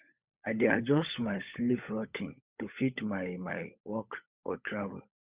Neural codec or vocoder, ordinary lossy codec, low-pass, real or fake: codec, 16 kHz, 16 kbps, FunCodec, trained on LibriTTS, 50 frames a second; Opus, 64 kbps; 3.6 kHz; fake